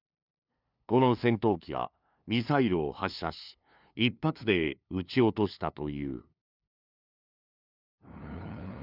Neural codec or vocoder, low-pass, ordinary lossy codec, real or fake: codec, 16 kHz, 2 kbps, FunCodec, trained on LibriTTS, 25 frames a second; 5.4 kHz; none; fake